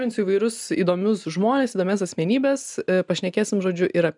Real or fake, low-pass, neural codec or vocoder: real; 10.8 kHz; none